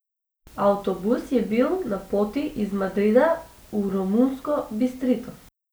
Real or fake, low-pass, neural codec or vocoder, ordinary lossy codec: real; none; none; none